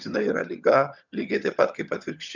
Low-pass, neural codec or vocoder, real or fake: 7.2 kHz; vocoder, 22.05 kHz, 80 mel bands, HiFi-GAN; fake